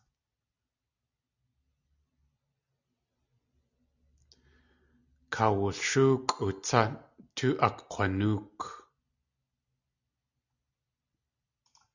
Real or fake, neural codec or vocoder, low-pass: real; none; 7.2 kHz